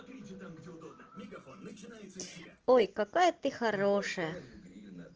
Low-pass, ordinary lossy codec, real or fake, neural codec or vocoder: 7.2 kHz; Opus, 16 kbps; real; none